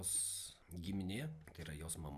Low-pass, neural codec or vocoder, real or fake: 14.4 kHz; none; real